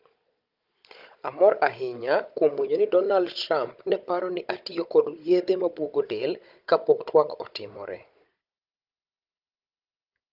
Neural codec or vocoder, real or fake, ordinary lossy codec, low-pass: codec, 16 kHz, 16 kbps, FunCodec, trained on Chinese and English, 50 frames a second; fake; Opus, 24 kbps; 5.4 kHz